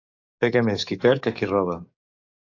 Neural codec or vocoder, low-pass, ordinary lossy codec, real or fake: codec, 44.1 kHz, 7.8 kbps, DAC; 7.2 kHz; AAC, 48 kbps; fake